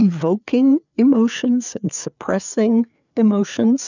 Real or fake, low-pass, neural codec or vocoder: fake; 7.2 kHz; codec, 16 kHz, 4 kbps, FreqCodec, larger model